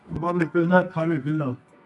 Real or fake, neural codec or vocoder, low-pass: fake; codec, 24 kHz, 0.9 kbps, WavTokenizer, medium music audio release; 10.8 kHz